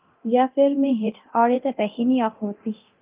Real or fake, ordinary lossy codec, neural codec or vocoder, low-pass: fake; Opus, 24 kbps; codec, 24 kHz, 0.9 kbps, DualCodec; 3.6 kHz